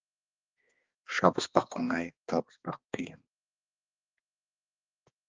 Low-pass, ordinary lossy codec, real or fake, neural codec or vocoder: 7.2 kHz; Opus, 24 kbps; fake; codec, 16 kHz, 2 kbps, X-Codec, HuBERT features, trained on balanced general audio